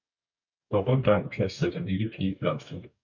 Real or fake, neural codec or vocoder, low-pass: fake; codec, 44.1 kHz, 2.6 kbps, DAC; 7.2 kHz